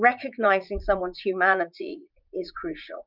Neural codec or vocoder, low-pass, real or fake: vocoder, 44.1 kHz, 80 mel bands, Vocos; 5.4 kHz; fake